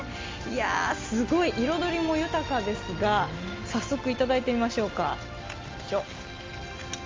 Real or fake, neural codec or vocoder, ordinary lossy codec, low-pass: real; none; Opus, 32 kbps; 7.2 kHz